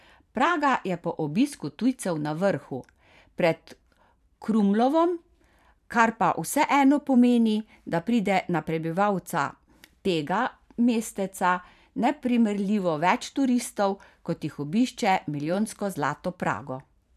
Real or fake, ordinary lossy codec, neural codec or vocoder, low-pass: fake; none; vocoder, 44.1 kHz, 128 mel bands every 512 samples, BigVGAN v2; 14.4 kHz